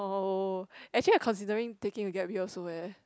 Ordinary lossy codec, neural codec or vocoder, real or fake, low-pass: none; none; real; none